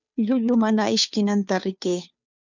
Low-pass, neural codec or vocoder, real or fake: 7.2 kHz; codec, 16 kHz, 2 kbps, FunCodec, trained on Chinese and English, 25 frames a second; fake